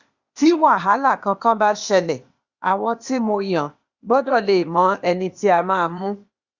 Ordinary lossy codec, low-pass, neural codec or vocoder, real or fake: Opus, 64 kbps; 7.2 kHz; codec, 16 kHz, 0.8 kbps, ZipCodec; fake